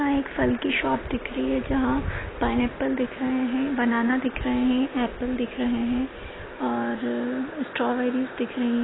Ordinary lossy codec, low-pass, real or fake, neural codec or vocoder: AAC, 16 kbps; 7.2 kHz; real; none